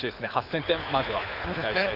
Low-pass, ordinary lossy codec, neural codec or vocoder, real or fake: 5.4 kHz; AAC, 32 kbps; codec, 24 kHz, 6 kbps, HILCodec; fake